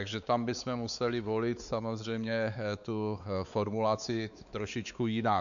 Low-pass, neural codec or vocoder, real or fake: 7.2 kHz; codec, 16 kHz, 4 kbps, X-Codec, WavLM features, trained on Multilingual LibriSpeech; fake